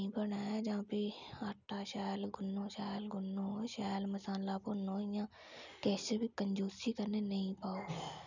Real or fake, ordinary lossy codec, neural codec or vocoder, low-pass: real; none; none; 7.2 kHz